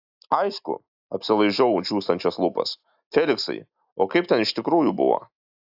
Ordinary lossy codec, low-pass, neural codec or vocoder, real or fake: AAC, 48 kbps; 5.4 kHz; none; real